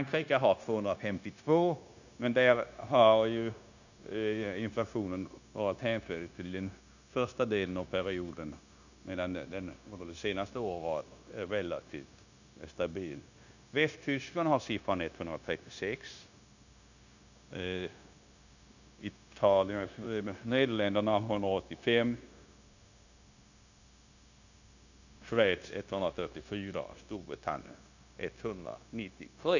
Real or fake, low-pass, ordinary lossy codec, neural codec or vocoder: fake; 7.2 kHz; none; codec, 16 kHz, 0.9 kbps, LongCat-Audio-Codec